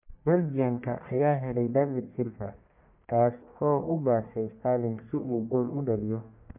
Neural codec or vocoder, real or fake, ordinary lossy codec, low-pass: codec, 44.1 kHz, 1.7 kbps, Pupu-Codec; fake; MP3, 32 kbps; 3.6 kHz